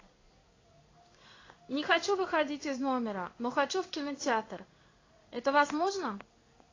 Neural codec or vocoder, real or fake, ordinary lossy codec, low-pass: codec, 16 kHz in and 24 kHz out, 1 kbps, XY-Tokenizer; fake; AAC, 32 kbps; 7.2 kHz